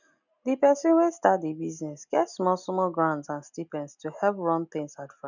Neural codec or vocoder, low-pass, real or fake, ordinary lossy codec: none; 7.2 kHz; real; none